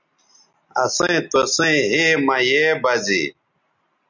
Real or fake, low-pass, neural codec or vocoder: real; 7.2 kHz; none